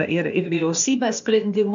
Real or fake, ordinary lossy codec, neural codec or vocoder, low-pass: fake; MP3, 64 kbps; codec, 16 kHz, 0.8 kbps, ZipCodec; 7.2 kHz